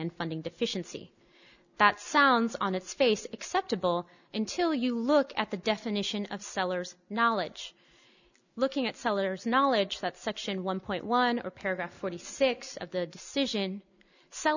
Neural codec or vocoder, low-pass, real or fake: none; 7.2 kHz; real